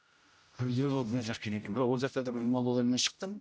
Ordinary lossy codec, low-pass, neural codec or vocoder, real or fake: none; none; codec, 16 kHz, 0.5 kbps, X-Codec, HuBERT features, trained on general audio; fake